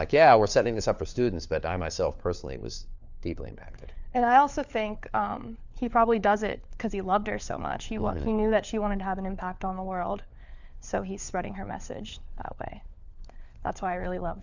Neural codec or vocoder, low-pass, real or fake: codec, 16 kHz, 4 kbps, FunCodec, trained on LibriTTS, 50 frames a second; 7.2 kHz; fake